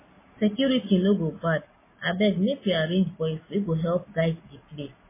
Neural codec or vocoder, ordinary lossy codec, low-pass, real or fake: none; MP3, 16 kbps; 3.6 kHz; real